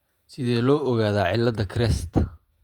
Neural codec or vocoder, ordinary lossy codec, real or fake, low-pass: vocoder, 48 kHz, 128 mel bands, Vocos; Opus, 64 kbps; fake; 19.8 kHz